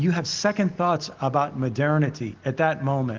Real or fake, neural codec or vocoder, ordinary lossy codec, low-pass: real; none; Opus, 16 kbps; 7.2 kHz